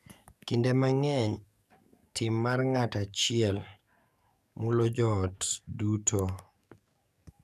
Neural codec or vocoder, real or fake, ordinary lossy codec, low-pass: codec, 44.1 kHz, 7.8 kbps, DAC; fake; none; 14.4 kHz